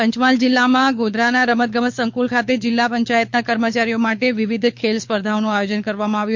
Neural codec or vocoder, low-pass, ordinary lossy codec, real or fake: codec, 24 kHz, 6 kbps, HILCodec; 7.2 kHz; MP3, 48 kbps; fake